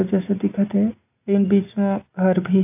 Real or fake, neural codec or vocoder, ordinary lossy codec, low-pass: real; none; AAC, 32 kbps; 3.6 kHz